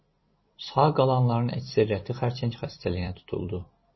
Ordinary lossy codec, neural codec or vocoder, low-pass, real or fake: MP3, 24 kbps; vocoder, 44.1 kHz, 128 mel bands every 256 samples, BigVGAN v2; 7.2 kHz; fake